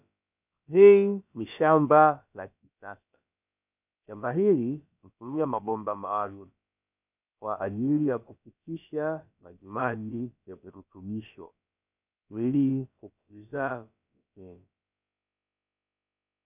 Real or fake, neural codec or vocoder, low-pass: fake; codec, 16 kHz, about 1 kbps, DyCAST, with the encoder's durations; 3.6 kHz